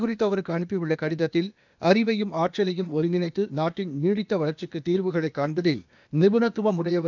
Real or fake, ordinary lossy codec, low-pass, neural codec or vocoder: fake; none; 7.2 kHz; codec, 16 kHz, 0.8 kbps, ZipCodec